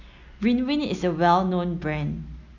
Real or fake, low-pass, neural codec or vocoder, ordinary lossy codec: real; 7.2 kHz; none; none